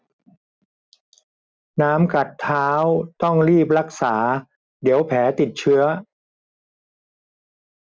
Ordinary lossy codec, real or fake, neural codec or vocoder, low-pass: none; real; none; none